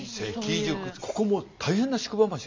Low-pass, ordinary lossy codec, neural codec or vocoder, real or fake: 7.2 kHz; MP3, 64 kbps; none; real